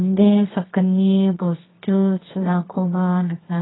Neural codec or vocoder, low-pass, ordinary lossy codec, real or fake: codec, 24 kHz, 0.9 kbps, WavTokenizer, medium music audio release; 7.2 kHz; AAC, 16 kbps; fake